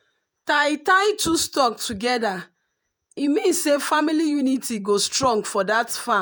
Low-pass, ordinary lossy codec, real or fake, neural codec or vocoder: none; none; fake; vocoder, 48 kHz, 128 mel bands, Vocos